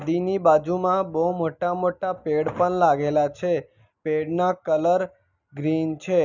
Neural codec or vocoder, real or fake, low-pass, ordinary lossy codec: none; real; 7.2 kHz; none